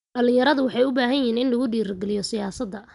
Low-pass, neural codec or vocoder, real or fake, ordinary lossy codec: 10.8 kHz; none; real; none